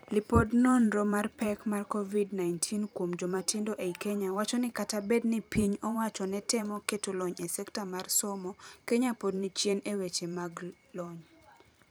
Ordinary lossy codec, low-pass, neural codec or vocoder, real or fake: none; none; vocoder, 44.1 kHz, 128 mel bands every 512 samples, BigVGAN v2; fake